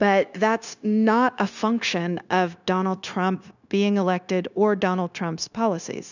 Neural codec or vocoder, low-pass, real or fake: codec, 16 kHz, 0.9 kbps, LongCat-Audio-Codec; 7.2 kHz; fake